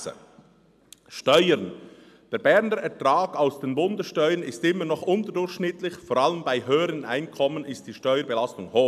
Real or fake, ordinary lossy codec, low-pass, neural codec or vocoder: real; none; 14.4 kHz; none